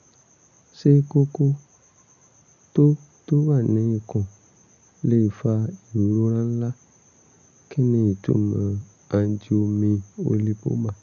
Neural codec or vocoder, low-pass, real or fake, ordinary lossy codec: none; 7.2 kHz; real; none